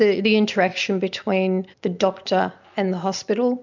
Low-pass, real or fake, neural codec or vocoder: 7.2 kHz; real; none